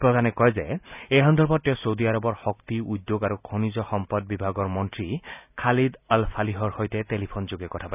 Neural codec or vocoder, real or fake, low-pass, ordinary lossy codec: none; real; 3.6 kHz; none